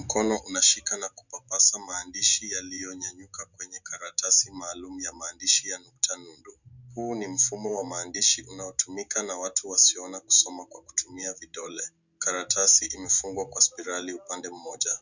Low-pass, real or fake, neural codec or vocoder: 7.2 kHz; real; none